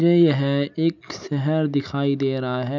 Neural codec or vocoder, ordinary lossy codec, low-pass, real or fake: none; none; 7.2 kHz; real